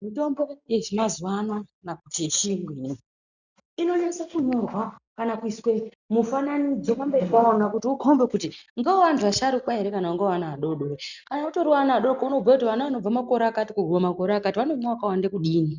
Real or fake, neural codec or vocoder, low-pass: real; none; 7.2 kHz